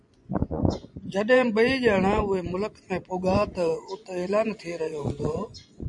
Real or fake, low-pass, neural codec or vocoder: fake; 10.8 kHz; vocoder, 24 kHz, 100 mel bands, Vocos